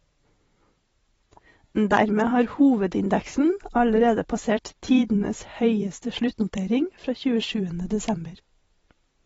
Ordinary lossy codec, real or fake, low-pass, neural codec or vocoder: AAC, 24 kbps; fake; 19.8 kHz; vocoder, 44.1 kHz, 128 mel bands every 256 samples, BigVGAN v2